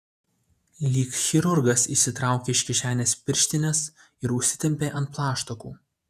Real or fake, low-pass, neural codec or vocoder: fake; 14.4 kHz; vocoder, 48 kHz, 128 mel bands, Vocos